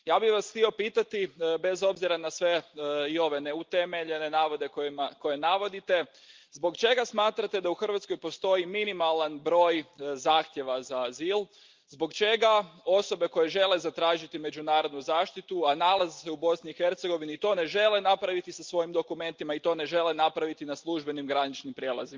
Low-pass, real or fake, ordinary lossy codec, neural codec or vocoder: 7.2 kHz; real; Opus, 32 kbps; none